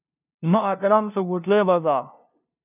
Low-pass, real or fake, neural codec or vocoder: 3.6 kHz; fake; codec, 16 kHz, 0.5 kbps, FunCodec, trained on LibriTTS, 25 frames a second